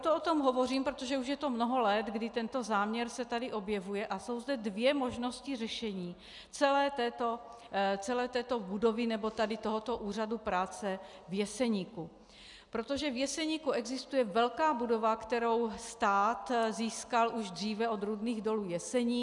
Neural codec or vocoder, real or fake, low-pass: none; real; 10.8 kHz